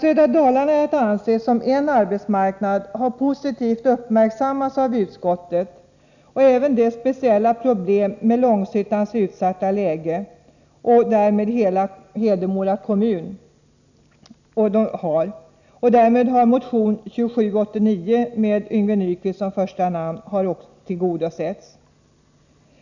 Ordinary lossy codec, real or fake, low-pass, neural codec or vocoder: none; real; 7.2 kHz; none